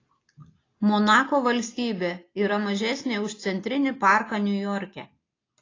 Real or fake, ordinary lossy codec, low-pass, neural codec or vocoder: real; AAC, 32 kbps; 7.2 kHz; none